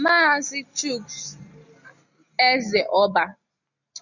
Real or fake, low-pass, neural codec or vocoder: real; 7.2 kHz; none